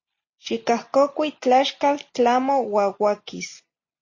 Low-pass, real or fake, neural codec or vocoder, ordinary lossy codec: 7.2 kHz; real; none; MP3, 32 kbps